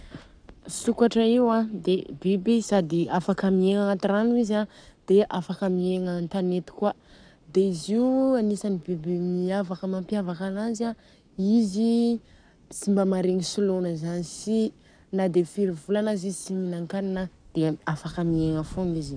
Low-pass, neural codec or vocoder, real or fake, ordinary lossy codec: 9.9 kHz; codec, 44.1 kHz, 7.8 kbps, Pupu-Codec; fake; none